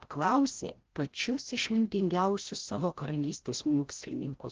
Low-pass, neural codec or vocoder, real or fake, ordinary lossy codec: 7.2 kHz; codec, 16 kHz, 0.5 kbps, FreqCodec, larger model; fake; Opus, 16 kbps